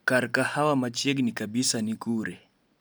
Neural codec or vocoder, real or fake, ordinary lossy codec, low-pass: none; real; none; none